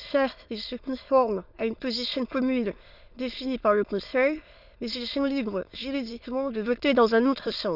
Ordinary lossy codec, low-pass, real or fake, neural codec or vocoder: none; 5.4 kHz; fake; autoencoder, 22.05 kHz, a latent of 192 numbers a frame, VITS, trained on many speakers